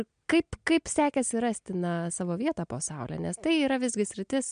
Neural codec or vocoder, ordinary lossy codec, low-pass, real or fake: none; MP3, 64 kbps; 9.9 kHz; real